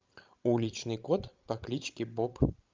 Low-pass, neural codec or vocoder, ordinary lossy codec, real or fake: 7.2 kHz; codec, 16 kHz, 16 kbps, FreqCodec, larger model; Opus, 24 kbps; fake